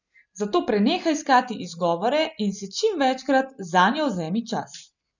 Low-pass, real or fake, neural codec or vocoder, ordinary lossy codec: 7.2 kHz; real; none; none